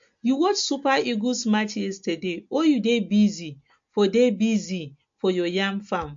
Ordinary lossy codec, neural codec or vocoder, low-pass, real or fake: MP3, 48 kbps; none; 7.2 kHz; real